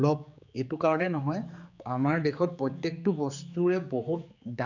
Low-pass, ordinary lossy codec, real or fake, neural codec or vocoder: 7.2 kHz; none; fake; codec, 16 kHz, 4 kbps, X-Codec, HuBERT features, trained on general audio